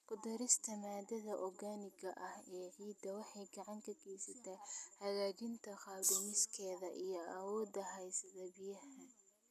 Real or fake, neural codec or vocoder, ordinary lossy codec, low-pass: real; none; none; 14.4 kHz